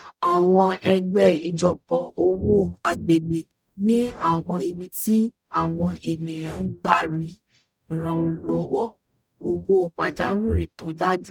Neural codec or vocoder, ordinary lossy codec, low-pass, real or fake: codec, 44.1 kHz, 0.9 kbps, DAC; none; 19.8 kHz; fake